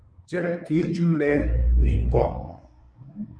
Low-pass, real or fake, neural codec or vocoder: 9.9 kHz; fake; codec, 24 kHz, 1 kbps, SNAC